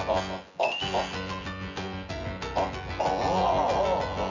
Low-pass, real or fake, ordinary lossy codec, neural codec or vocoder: 7.2 kHz; fake; none; vocoder, 24 kHz, 100 mel bands, Vocos